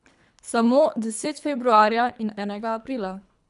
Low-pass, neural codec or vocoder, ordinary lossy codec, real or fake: 10.8 kHz; codec, 24 kHz, 3 kbps, HILCodec; none; fake